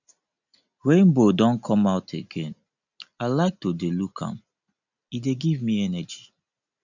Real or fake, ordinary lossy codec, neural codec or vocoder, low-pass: real; none; none; 7.2 kHz